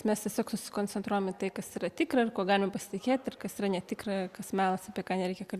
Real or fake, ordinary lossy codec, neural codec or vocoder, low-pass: real; Opus, 64 kbps; none; 14.4 kHz